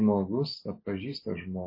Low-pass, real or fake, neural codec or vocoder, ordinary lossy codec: 5.4 kHz; real; none; MP3, 24 kbps